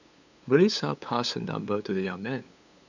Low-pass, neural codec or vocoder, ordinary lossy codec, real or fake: 7.2 kHz; codec, 16 kHz, 8 kbps, FunCodec, trained on LibriTTS, 25 frames a second; none; fake